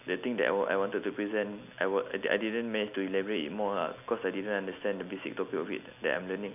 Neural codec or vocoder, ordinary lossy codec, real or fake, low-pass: none; none; real; 3.6 kHz